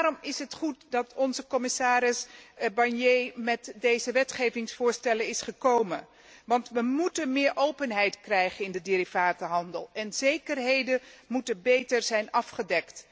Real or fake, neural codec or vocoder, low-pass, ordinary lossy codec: real; none; none; none